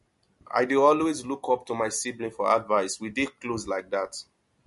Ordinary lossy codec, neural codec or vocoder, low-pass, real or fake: MP3, 48 kbps; none; 14.4 kHz; real